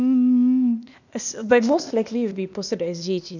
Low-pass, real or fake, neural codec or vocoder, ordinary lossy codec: 7.2 kHz; fake; codec, 16 kHz, 0.8 kbps, ZipCodec; none